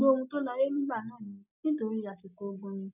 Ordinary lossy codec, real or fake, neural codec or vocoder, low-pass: none; real; none; 3.6 kHz